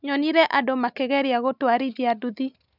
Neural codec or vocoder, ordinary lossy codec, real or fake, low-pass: none; none; real; 5.4 kHz